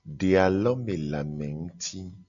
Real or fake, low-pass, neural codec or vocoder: real; 7.2 kHz; none